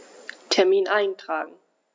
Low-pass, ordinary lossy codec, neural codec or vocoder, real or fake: none; none; none; real